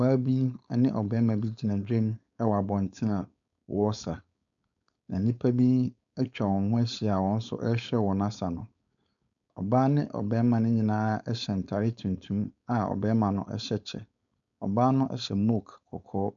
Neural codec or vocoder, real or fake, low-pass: codec, 16 kHz, 4.8 kbps, FACodec; fake; 7.2 kHz